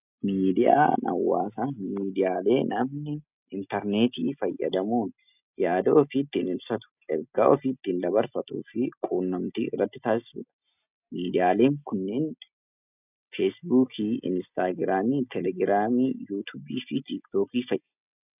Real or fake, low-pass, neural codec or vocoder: real; 3.6 kHz; none